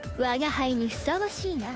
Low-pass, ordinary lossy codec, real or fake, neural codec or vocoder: none; none; fake; codec, 16 kHz, 2 kbps, FunCodec, trained on Chinese and English, 25 frames a second